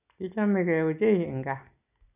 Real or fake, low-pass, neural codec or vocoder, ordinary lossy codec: real; 3.6 kHz; none; none